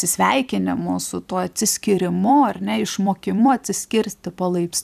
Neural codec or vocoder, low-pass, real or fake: vocoder, 44.1 kHz, 128 mel bands every 256 samples, BigVGAN v2; 14.4 kHz; fake